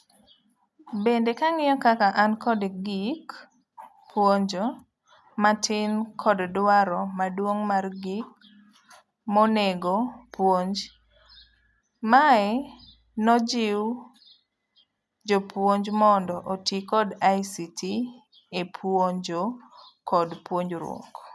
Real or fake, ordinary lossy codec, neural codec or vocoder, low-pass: real; none; none; none